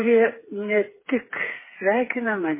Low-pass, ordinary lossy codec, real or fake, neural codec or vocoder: 3.6 kHz; MP3, 16 kbps; fake; codec, 16 kHz, 4 kbps, FreqCodec, smaller model